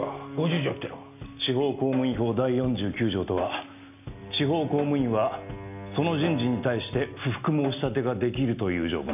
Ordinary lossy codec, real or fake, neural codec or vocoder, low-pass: none; real; none; 3.6 kHz